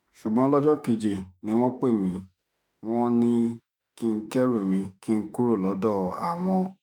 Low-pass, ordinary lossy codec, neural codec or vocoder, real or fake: 19.8 kHz; none; autoencoder, 48 kHz, 32 numbers a frame, DAC-VAE, trained on Japanese speech; fake